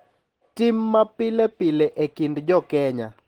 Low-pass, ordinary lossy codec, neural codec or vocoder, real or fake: 19.8 kHz; Opus, 16 kbps; none; real